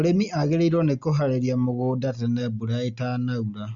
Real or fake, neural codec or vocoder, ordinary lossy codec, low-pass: real; none; Opus, 64 kbps; 7.2 kHz